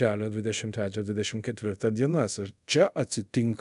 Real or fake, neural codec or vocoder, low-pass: fake; codec, 24 kHz, 0.5 kbps, DualCodec; 10.8 kHz